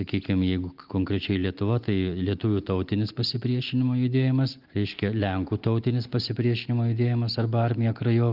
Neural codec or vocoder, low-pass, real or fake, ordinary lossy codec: none; 5.4 kHz; real; Opus, 24 kbps